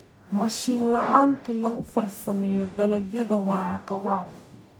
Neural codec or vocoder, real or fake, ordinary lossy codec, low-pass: codec, 44.1 kHz, 0.9 kbps, DAC; fake; none; none